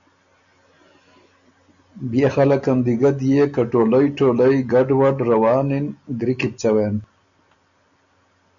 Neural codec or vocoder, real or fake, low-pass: none; real; 7.2 kHz